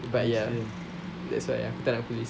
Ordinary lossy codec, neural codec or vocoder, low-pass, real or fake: none; none; none; real